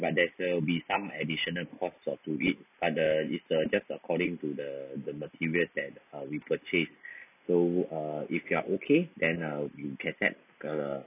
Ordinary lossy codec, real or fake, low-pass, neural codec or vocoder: none; real; 3.6 kHz; none